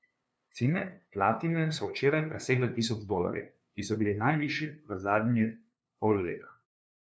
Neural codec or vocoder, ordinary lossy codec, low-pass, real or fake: codec, 16 kHz, 2 kbps, FunCodec, trained on LibriTTS, 25 frames a second; none; none; fake